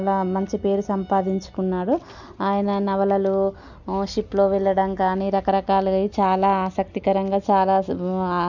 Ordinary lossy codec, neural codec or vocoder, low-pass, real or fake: none; none; 7.2 kHz; real